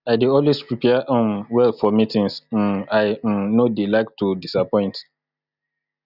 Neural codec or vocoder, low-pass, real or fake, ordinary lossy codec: none; 5.4 kHz; real; none